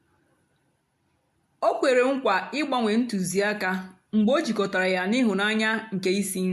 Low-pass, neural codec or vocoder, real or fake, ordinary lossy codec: 14.4 kHz; none; real; MP3, 64 kbps